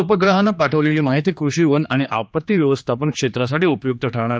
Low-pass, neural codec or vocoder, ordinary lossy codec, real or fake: none; codec, 16 kHz, 2 kbps, X-Codec, HuBERT features, trained on general audio; none; fake